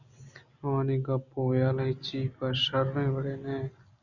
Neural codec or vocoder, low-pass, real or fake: none; 7.2 kHz; real